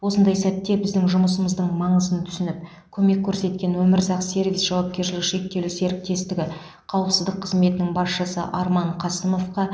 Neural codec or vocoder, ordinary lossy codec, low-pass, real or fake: none; none; none; real